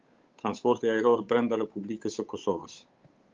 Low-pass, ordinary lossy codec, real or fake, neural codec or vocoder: 7.2 kHz; Opus, 32 kbps; fake; codec, 16 kHz, 8 kbps, FunCodec, trained on Chinese and English, 25 frames a second